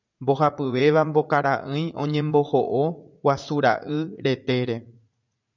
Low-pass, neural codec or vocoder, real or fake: 7.2 kHz; vocoder, 44.1 kHz, 80 mel bands, Vocos; fake